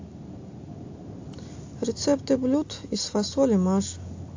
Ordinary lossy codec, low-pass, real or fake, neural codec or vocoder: AAC, 48 kbps; 7.2 kHz; real; none